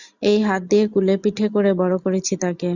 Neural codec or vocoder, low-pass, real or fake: none; 7.2 kHz; real